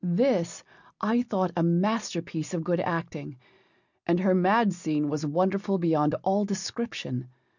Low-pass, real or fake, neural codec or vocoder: 7.2 kHz; real; none